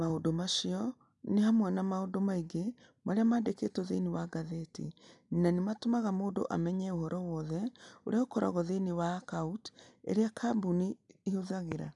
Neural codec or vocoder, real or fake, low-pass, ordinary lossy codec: none; real; 10.8 kHz; none